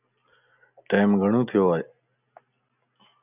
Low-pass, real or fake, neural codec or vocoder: 3.6 kHz; real; none